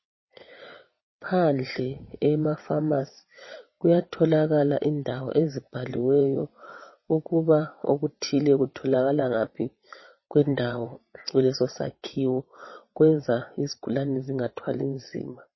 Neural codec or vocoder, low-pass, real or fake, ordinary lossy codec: vocoder, 22.05 kHz, 80 mel bands, WaveNeXt; 7.2 kHz; fake; MP3, 24 kbps